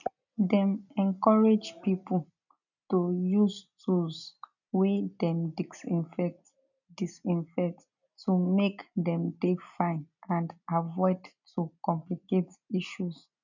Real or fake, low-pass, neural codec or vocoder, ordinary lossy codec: real; 7.2 kHz; none; none